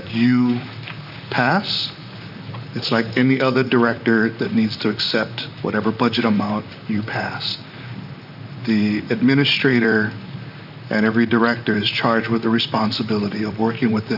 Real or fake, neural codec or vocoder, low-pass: fake; vocoder, 44.1 kHz, 128 mel bands, Pupu-Vocoder; 5.4 kHz